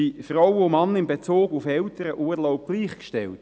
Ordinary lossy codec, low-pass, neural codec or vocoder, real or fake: none; none; none; real